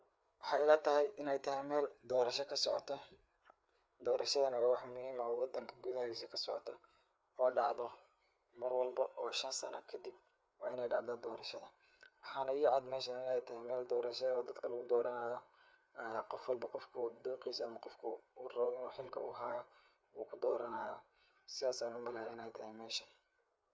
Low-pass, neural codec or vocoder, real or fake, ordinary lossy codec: none; codec, 16 kHz, 4 kbps, FreqCodec, larger model; fake; none